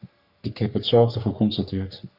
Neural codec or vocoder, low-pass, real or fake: codec, 44.1 kHz, 3.4 kbps, Pupu-Codec; 5.4 kHz; fake